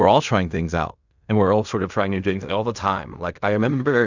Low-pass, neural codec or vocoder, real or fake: 7.2 kHz; codec, 16 kHz in and 24 kHz out, 0.4 kbps, LongCat-Audio-Codec, fine tuned four codebook decoder; fake